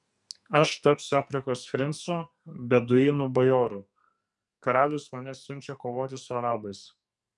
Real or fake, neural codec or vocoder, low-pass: fake; codec, 44.1 kHz, 2.6 kbps, SNAC; 10.8 kHz